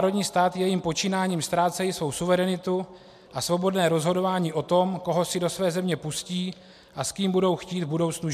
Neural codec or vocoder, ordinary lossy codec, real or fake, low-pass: none; MP3, 96 kbps; real; 14.4 kHz